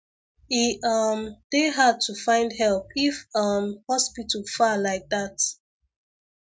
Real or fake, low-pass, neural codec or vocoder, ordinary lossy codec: real; none; none; none